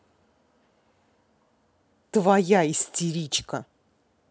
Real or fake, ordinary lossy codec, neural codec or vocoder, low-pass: real; none; none; none